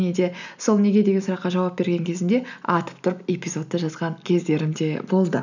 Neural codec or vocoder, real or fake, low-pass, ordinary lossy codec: none; real; 7.2 kHz; none